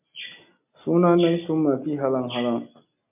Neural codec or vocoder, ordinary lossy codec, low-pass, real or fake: none; MP3, 32 kbps; 3.6 kHz; real